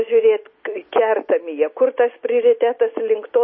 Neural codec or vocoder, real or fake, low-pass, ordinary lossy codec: none; real; 7.2 kHz; MP3, 24 kbps